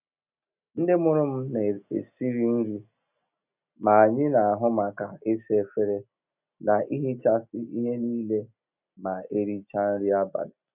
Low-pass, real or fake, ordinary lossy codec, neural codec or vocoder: 3.6 kHz; real; none; none